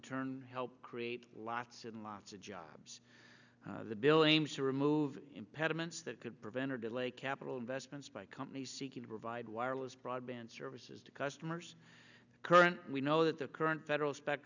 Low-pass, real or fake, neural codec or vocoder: 7.2 kHz; real; none